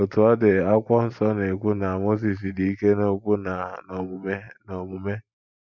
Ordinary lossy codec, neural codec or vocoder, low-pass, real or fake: none; vocoder, 44.1 kHz, 128 mel bands every 512 samples, BigVGAN v2; 7.2 kHz; fake